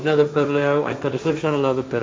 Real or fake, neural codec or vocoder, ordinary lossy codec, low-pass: fake; codec, 16 kHz, 1.1 kbps, Voila-Tokenizer; none; none